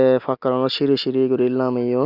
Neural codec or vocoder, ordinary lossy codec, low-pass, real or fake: none; Opus, 64 kbps; 5.4 kHz; real